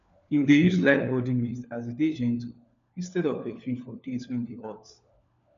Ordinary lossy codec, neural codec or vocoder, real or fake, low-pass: none; codec, 16 kHz, 4 kbps, FunCodec, trained on LibriTTS, 50 frames a second; fake; 7.2 kHz